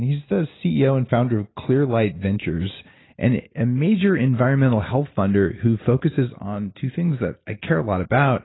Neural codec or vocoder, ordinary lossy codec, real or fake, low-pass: none; AAC, 16 kbps; real; 7.2 kHz